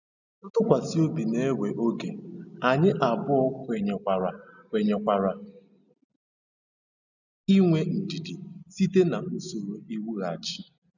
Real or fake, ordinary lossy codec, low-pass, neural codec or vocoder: real; none; 7.2 kHz; none